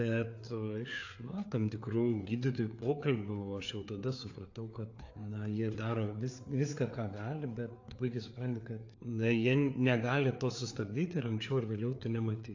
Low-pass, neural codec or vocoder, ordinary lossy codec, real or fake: 7.2 kHz; codec, 16 kHz, 4 kbps, FreqCodec, larger model; AAC, 48 kbps; fake